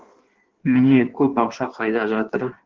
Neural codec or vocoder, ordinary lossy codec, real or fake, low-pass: codec, 16 kHz in and 24 kHz out, 1.1 kbps, FireRedTTS-2 codec; Opus, 16 kbps; fake; 7.2 kHz